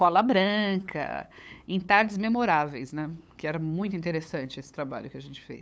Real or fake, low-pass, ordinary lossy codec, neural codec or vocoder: fake; none; none; codec, 16 kHz, 8 kbps, FunCodec, trained on LibriTTS, 25 frames a second